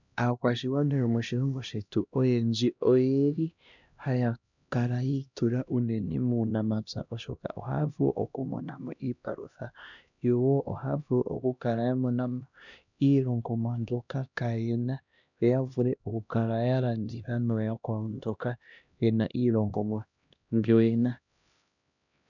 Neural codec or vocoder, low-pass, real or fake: codec, 16 kHz, 1 kbps, X-Codec, HuBERT features, trained on LibriSpeech; 7.2 kHz; fake